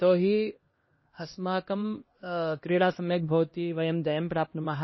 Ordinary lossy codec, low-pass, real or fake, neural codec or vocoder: MP3, 24 kbps; 7.2 kHz; fake; codec, 16 kHz, 1 kbps, X-Codec, HuBERT features, trained on LibriSpeech